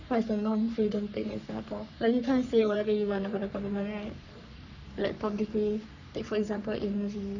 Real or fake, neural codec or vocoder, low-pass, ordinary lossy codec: fake; codec, 44.1 kHz, 3.4 kbps, Pupu-Codec; 7.2 kHz; none